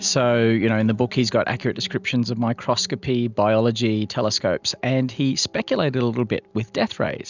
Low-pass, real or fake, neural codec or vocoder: 7.2 kHz; real; none